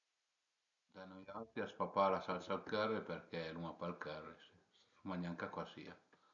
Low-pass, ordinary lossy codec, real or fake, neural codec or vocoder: 7.2 kHz; none; real; none